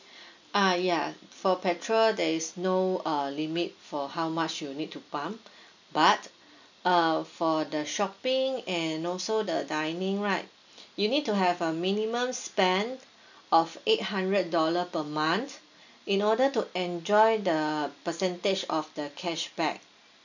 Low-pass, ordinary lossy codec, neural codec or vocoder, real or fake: 7.2 kHz; none; none; real